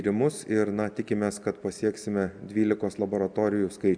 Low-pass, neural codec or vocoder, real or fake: 9.9 kHz; none; real